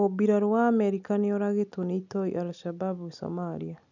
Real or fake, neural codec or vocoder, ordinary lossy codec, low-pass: real; none; none; 7.2 kHz